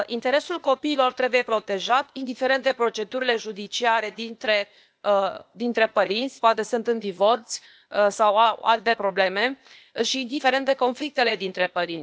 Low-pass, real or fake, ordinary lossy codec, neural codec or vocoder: none; fake; none; codec, 16 kHz, 0.8 kbps, ZipCodec